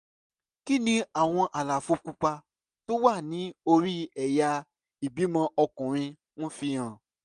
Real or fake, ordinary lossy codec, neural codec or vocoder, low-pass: real; none; none; 10.8 kHz